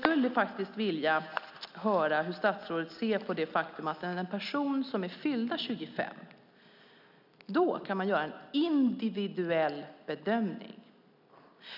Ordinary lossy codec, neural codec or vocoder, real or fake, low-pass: none; none; real; 5.4 kHz